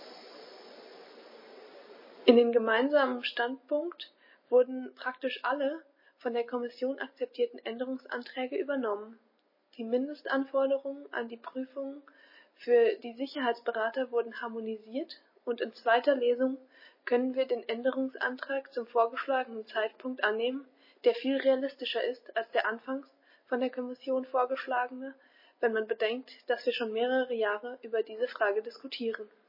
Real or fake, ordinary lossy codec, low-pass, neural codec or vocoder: real; MP3, 24 kbps; 5.4 kHz; none